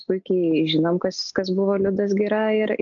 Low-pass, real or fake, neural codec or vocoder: 7.2 kHz; real; none